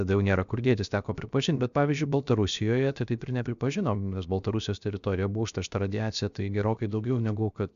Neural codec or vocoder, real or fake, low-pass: codec, 16 kHz, 0.7 kbps, FocalCodec; fake; 7.2 kHz